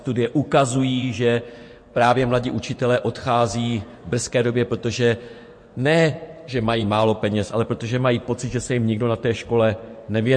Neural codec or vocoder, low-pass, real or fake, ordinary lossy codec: vocoder, 24 kHz, 100 mel bands, Vocos; 9.9 kHz; fake; MP3, 48 kbps